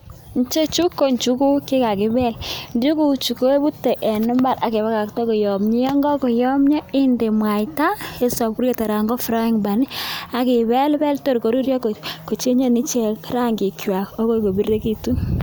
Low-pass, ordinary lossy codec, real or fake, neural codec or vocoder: none; none; real; none